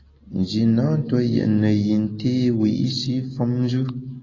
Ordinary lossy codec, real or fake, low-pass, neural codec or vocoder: AAC, 32 kbps; real; 7.2 kHz; none